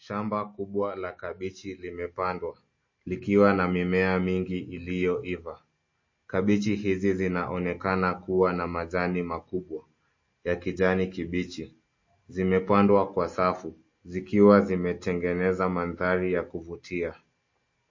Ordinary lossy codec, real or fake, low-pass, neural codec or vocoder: MP3, 32 kbps; real; 7.2 kHz; none